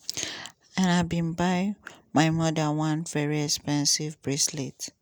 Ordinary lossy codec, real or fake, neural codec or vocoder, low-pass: none; real; none; none